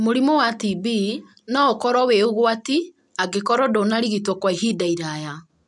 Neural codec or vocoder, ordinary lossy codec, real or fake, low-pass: none; none; real; 10.8 kHz